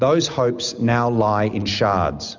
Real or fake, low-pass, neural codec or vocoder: real; 7.2 kHz; none